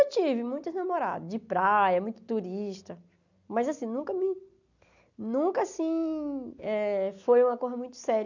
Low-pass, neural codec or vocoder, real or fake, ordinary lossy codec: 7.2 kHz; none; real; none